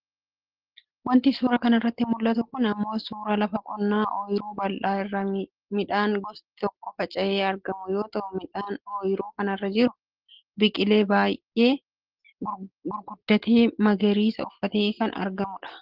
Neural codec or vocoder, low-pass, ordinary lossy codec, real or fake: none; 5.4 kHz; Opus, 16 kbps; real